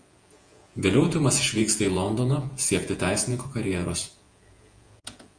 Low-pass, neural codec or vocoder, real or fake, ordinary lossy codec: 9.9 kHz; vocoder, 48 kHz, 128 mel bands, Vocos; fake; Opus, 32 kbps